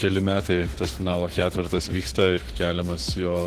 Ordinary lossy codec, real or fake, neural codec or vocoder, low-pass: Opus, 16 kbps; fake; autoencoder, 48 kHz, 32 numbers a frame, DAC-VAE, trained on Japanese speech; 14.4 kHz